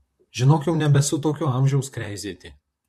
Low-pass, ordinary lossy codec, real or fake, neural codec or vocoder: 14.4 kHz; MP3, 64 kbps; fake; vocoder, 44.1 kHz, 128 mel bands, Pupu-Vocoder